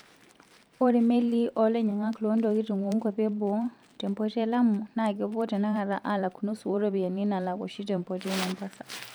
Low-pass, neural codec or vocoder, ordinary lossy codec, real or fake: none; vocoder, 44.1 kHz, 128 mel bands every 512 samples, BigVGAN v2; none; fake